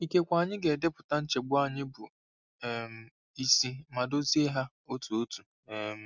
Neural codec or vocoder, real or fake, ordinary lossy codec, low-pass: none; real; none; 7.2 kHz